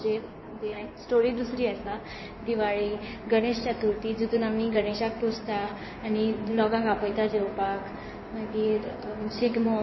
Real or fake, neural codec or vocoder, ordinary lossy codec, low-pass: fake; codec, 16 kHz in and 24 kHz out, 2.2 kbps, FireRedTTS-2 codec; MP3, 24 kbps; 7.2 kHz